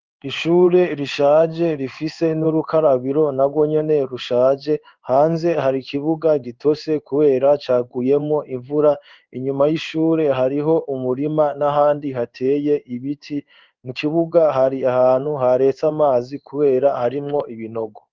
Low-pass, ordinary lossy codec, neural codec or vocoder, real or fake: 7.2 kHz; Opus, 32 kbps; codec, 16 kHz in and 24 kHz out, 1 kbps, XY-Tokenizer; fake